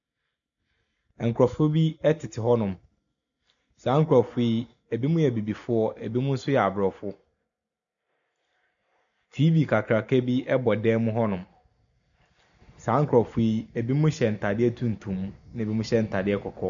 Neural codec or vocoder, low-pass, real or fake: none; 7.2 kHz; real